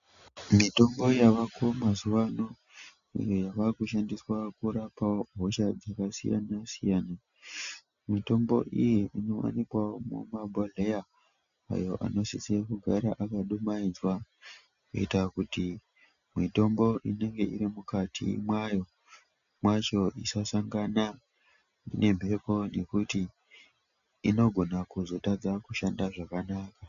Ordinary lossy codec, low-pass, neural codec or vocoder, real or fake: MP3, 96 kbps; 7.2 kHz; none; real